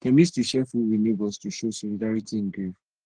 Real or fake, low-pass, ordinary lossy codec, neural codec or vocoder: fake; 9.9 kHz; Opus, 16 kbps; codec, 44.1 kHz, 3.4 kbps, Pupu-Codec